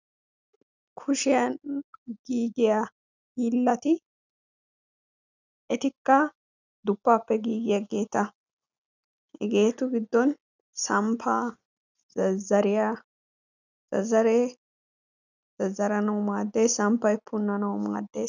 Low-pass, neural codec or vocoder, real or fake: 7.2 kHz; none; real